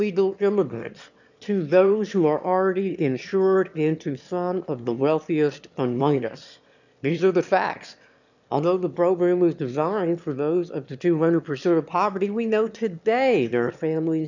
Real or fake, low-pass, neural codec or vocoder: fake; 7.2 kHz; autoencoder, 22.05 kHz, a latent of 192 numbers a frame, VITS, trained on one speaker